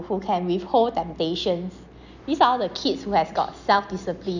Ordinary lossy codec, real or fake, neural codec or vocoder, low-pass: none; real; none; 7.2 kHz